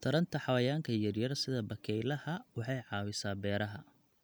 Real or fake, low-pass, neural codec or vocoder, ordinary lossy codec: real; none; none; none